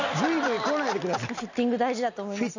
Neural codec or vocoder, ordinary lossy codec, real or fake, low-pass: none; none; real; 7.2 kHz